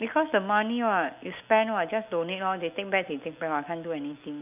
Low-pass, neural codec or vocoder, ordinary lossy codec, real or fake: 3.6 kHz; none; none; real